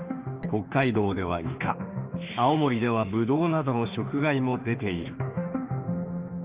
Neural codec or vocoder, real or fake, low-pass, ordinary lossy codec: autoencoder, 48 kHz, 32 numbers a frame, DAC-VAE, trained on Japanese speech; fake; 3.6 kHz; Opus, 24 kbps